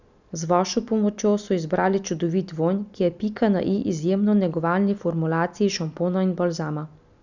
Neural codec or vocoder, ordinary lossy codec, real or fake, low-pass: none; none; real; 7.2 kHz